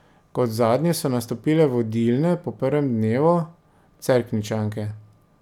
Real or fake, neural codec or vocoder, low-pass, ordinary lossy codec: real; none; 19.8 kHz; none